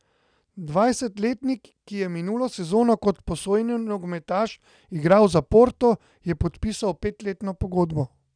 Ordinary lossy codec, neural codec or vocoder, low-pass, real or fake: none; none; 9.9 kHz; real